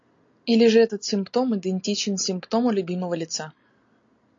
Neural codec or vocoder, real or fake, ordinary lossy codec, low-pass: none; real; AAC, 48 kbps; 7.2 kHz